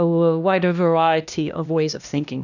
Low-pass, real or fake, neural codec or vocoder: 7.2 kHz; fake; codec, 16 kHz, 1 kbps, X-Codec, HuBERT features, trained on LibriSpeech